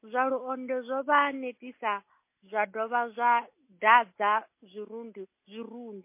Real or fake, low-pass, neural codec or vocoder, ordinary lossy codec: real; 3.6 kHz; none; MP3, 24 kbps